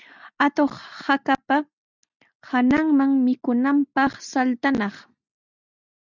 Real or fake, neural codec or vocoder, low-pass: real; none; 7.2 kHz